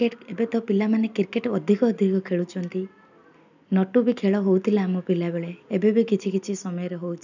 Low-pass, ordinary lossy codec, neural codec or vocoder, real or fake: 7.2 kHz; none; none; real